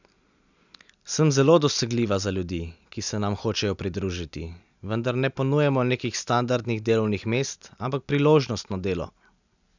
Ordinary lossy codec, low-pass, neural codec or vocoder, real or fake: none; 7.2 kHz; none; real